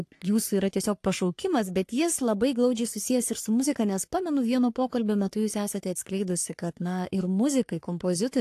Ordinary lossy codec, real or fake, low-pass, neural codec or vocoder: AAC, 64 kbps; fake; 14.4 kHz; codec, 44.1 kHz, 3.4 kbps, Pupu-Codec